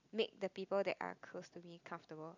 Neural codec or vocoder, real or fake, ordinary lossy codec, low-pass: none; real; none; 7.2 kHz